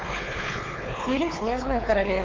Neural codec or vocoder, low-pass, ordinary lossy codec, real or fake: codec, 16 kHz, 4 kbps, X-Codec, WavLM features, trained on Multilingual LibriSpeech; 7.2 kHz; Opus, 32 kbps; fake